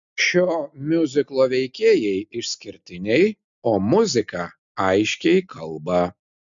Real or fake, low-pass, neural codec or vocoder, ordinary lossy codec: real; 7.2 kHz; none; MP3, 64 kbps